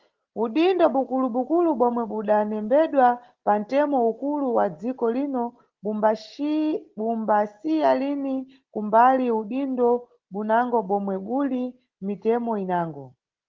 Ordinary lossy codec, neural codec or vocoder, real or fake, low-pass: Opus, 16 kbps; none; real; 7.2 kHz